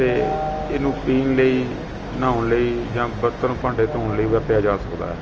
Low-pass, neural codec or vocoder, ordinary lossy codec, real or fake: 7.2 kHz; none; Opus, 24 kbps; real